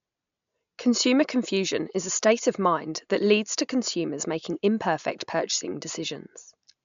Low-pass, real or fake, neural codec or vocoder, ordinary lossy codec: 7.2 kHz; real; none; MP3, 64 kbps